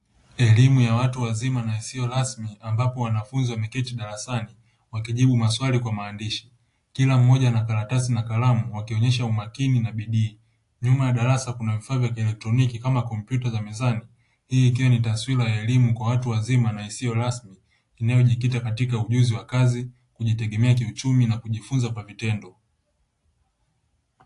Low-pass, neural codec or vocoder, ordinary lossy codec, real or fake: 10.8 kHz; none; AAC, 48 kbps; real